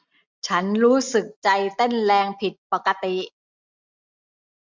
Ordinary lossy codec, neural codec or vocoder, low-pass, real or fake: MP3, 64 kbps; none; 7.2 kHz; real